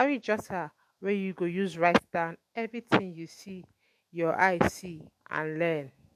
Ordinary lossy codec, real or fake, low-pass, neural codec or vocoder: MP3, 64 kbps; fake; 14.4 kHz; autoencoder, 48 kHz, 128 numbers a frame, DAC-VAE, trained on Japanese speech